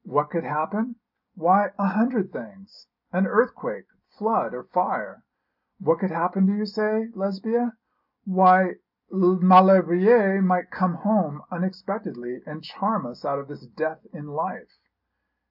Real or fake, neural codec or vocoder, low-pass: real; none; 5.4 kHz